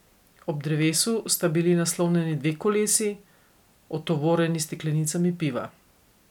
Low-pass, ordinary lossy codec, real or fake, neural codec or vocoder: 19.8 kHz; none; real; none